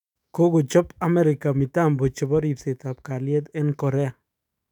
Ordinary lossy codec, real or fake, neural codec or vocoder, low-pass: none; fake; autoencoder, 48 kHz, 128 numbers a frame, DAC-VAE, trained on Japanese speech; 19.8 kHz